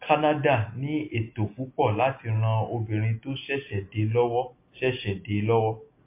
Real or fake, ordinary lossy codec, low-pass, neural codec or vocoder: real; MP3, 24 kbps; 3.6 kHz; none